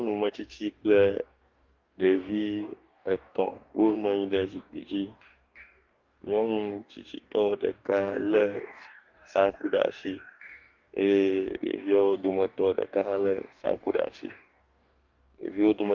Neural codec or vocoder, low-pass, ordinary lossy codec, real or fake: codec, 44.1 kHz, 2.6 kbps, DAC; 7.2 kHz; Opus, 24 kbps; fake